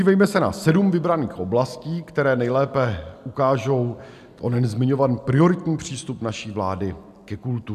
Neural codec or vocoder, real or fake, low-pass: none; real; 14.4 kHz